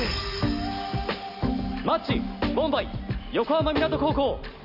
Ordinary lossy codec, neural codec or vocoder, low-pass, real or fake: none; none; 5.4 kHz; real